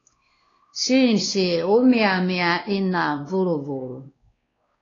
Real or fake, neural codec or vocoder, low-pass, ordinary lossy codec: fake; codec, 16 kHz, 2 kbps, X-Codec, WavLM features, trained on Multilingual LibriSpeech; 7.2 kHz; AAC, 32 kbps